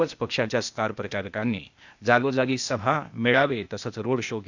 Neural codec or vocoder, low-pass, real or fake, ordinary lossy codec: codec, 16 kHz, 0.8 kbps, ZipCodec; 7.2 kHz; fake; none